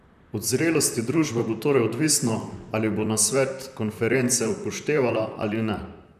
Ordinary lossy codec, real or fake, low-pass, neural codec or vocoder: none; fake; 14.4 kHz; vocoder, 44.1 kHz, 128 mel bands, Pupu-Vocoder